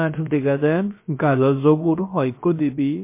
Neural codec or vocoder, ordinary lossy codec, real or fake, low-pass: codec, 16 kHz, about 1 kbps, DyCAST, with the encoder's durations; MP3, 24 kbps; fake; 3.6 kHz